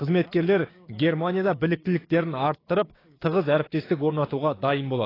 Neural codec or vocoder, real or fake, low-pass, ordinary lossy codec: codec, 16 kHz, 6 kbps, DAC; fake; 5.4 kHz; AAC, 24 kbps